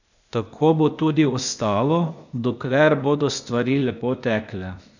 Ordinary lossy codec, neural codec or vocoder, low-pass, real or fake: none; codec, 16 kHz, 0.8 kbps, ZipCodec; 7.2 kHz; fake